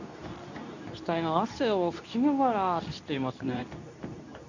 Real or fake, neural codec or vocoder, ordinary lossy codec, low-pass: fake; codec, 24 kHz, 0.9 kbps, WavTokenizer, medium speech release version 2; none; 7.2 kHz